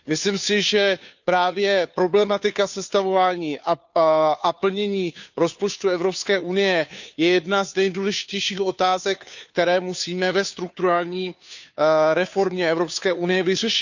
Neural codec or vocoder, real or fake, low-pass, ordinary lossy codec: codec, 16 kHz, 2 kbps, FunCodec, trained on Chinese and English, 25 frames a second; fake; 7.2 kHz; none